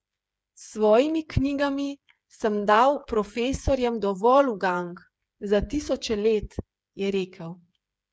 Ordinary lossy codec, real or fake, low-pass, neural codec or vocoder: none; fake; none; codec, 16 kHz, 8 kbps, FreqCodec, smaller model